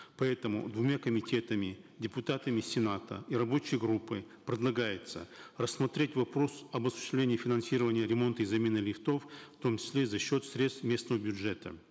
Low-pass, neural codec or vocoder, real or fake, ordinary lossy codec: none; none; real; none